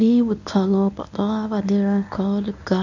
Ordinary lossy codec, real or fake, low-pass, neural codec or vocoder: none; fake; 7.2 kHz; codec, 24 kHz, 0.9 kbps, WavTokenizer, medium speech release version 1